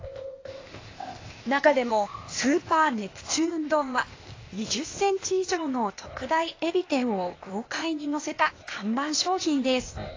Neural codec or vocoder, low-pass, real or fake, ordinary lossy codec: codec, 16 kHz, 0.8 kbps, ZipCodec; 7.2 kHz; fake; AAC, 32 kbps